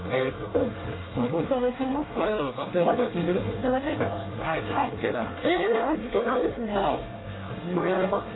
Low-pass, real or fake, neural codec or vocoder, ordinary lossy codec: 7.2 kHz; fake; codec, 24 kHz, 1 kbps, SNAC; AAC, 16 kbps